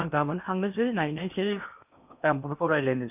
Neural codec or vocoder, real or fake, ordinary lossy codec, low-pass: codec, 16 kHz in and 24 kHz out, 0.8 kbps, FocalCodec, streaming, 65536 codes; fake; none; 3.6 kHz